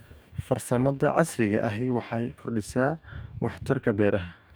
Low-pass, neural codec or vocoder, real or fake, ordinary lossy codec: none; codec, 44.1 kHz, 2.6 kbps, DAC; fake; none